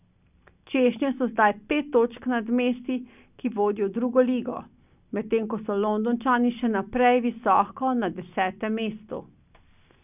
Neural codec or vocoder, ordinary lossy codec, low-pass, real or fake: none; none; 3.6 kHz; real